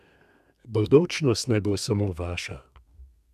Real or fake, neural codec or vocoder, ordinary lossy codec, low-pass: fake; codec, 32 kHz, 1.9 kbps, SNAC; none; 14.4 kHz